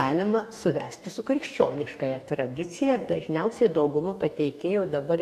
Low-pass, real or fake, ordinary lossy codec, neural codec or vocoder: 14.4 kHz; fake; AAC, 96 kbps; codec, 44.1 kHz, 2.6 kbps, DAC